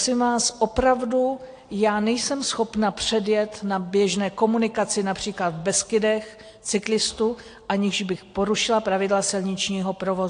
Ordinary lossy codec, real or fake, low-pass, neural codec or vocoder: AAC, 48 kbps; real; 9.9 kHz; none